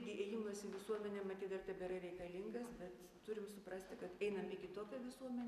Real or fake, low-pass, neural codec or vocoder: real; 14.4 kHz; none